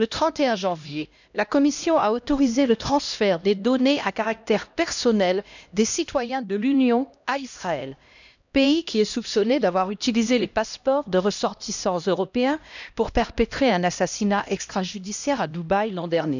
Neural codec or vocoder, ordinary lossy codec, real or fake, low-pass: codec, 16 kHz, 1 kbps, X-Codec, HuBERT features, trained on LibriSpeech; none; fake; 7.2 kHz